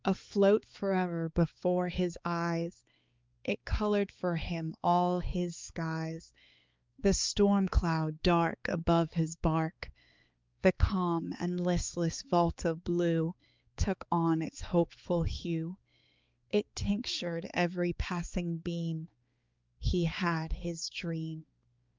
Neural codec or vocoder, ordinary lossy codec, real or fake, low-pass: codec, 16 kHz, 4 kbps, X-Codec, HuBERT features, trained on balanced general audio; Opus, 24 kbps; fake; 7.2 kHz